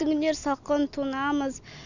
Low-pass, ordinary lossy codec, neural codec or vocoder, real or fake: 7.2 kHz; none; none; real